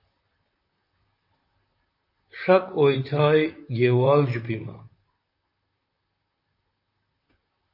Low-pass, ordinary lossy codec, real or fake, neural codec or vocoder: 5.4 kHz; MP3, 32 kbps; fake; vocoder, 44.1 kHz, 128 mel bands, Pupu-Vocoder